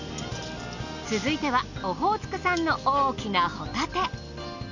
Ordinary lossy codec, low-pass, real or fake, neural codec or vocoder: none; 7.2 kHz; real; none